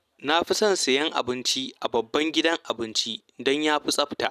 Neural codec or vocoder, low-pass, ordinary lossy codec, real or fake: none; 14.4 kHz; none; real